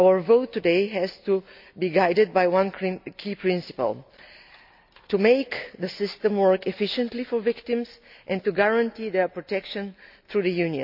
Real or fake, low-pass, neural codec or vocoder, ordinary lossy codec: real; 5.4 kHz; none; AAC, 48 kbps